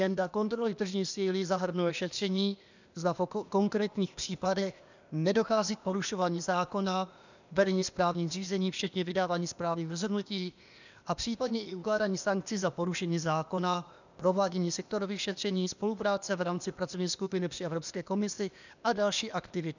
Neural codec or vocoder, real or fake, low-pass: codec, 16 kHz, 0.8 kbps, ZipCodec; fake; 7.2 kHz